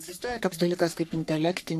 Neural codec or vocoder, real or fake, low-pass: codec, 44.1 kHz, 3.4 kbps, Pupu-Codec; fake; 14.4 kHz